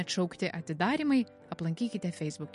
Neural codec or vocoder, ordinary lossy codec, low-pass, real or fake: none; MP3, 48 kbps; 14.4 kHz; real